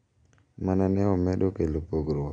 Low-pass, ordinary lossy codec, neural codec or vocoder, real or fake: 9.9 kHz; none; none; real